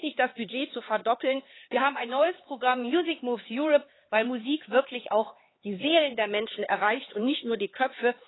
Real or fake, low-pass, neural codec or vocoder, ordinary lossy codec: fake; 7.2 kHz; codec, 16 kHz, 4 kbps, X-Codec, HuBERT features, trained on LibriSpeech; AAC, 16 kbps